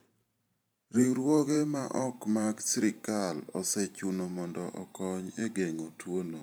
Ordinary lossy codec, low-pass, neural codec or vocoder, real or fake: none; none; vocoder, 44.1 kHz, 128 mel bands every 512 samples, BigVGAN v2; fake